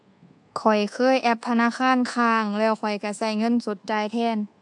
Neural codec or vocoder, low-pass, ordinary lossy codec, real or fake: codec, 24 kHz, 1.2 kbps, DualCodec; 10.8 kHz; AAC, 64 kbps; fake